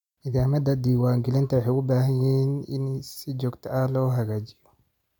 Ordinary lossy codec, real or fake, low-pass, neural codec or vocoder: none; real; 19.8 kHz; none